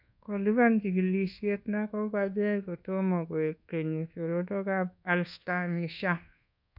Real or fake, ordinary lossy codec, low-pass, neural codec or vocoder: fake; none; 5.4 kHz; codec, 24 kHz, 1.2 kbps, DualCodec